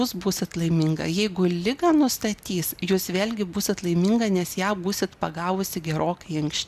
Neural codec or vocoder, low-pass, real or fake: none; 14.4 kHz; real